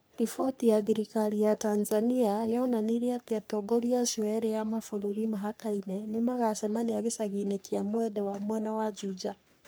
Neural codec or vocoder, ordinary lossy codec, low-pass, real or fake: codec, 44.1 kHz, 3.4 kbps, Pupu-Codec; none; none; fake